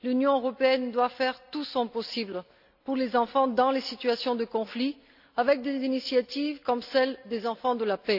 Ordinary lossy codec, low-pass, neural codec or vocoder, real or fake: AAC, 48 kbps; 5.4 kHz; none; real